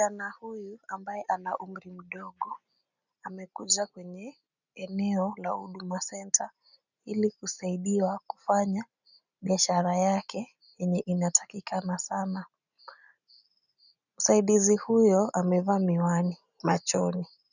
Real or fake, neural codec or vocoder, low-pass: real; none; 7.2 kHz